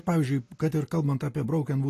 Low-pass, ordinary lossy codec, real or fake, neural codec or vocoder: 14.4 kHz; AAC, 48 kbps; real; none